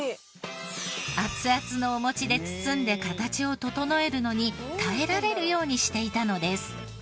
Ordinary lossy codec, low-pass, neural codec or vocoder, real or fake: none; none; none; real